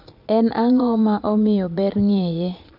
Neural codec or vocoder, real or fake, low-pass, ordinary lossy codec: vocoder, 22.05 kHz, 80 mel bands, Vocos; fake; 5.4 kHz; none